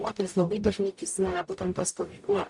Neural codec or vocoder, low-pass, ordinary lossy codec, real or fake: codec, 44.1 kHz, 0.9 kbps, DAC; 10.8 kHz; MP3, 96 kbps; fake